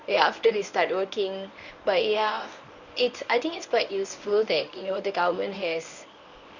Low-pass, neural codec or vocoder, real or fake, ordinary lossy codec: 7.2 kHz; codec, 24 kHz, 0.9 kbps, WavTokenizer, medium speech release version 1; fake; none